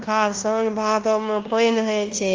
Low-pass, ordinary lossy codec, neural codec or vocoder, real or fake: 7.2 kHz; Opus, 24 kbps; codec, 16 kHz in and 24 kHz out, 0.9 kbps, LongCat-Audio-Codec, fine tuned four codebook decoder; fake